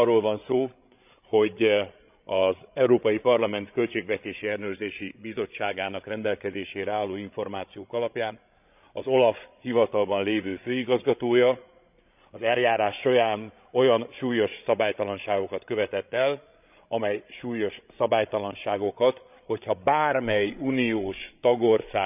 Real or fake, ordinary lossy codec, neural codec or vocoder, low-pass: fake; none; codec, 16 kHz, 16 kbps, FreqCodec, larger model; 3.6 kHz